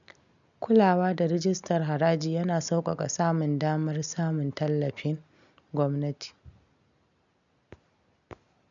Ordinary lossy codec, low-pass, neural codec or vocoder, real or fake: none; 7.2 kHz; none; real